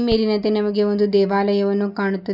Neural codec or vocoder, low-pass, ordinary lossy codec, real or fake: none; 5.4 kHz; none; real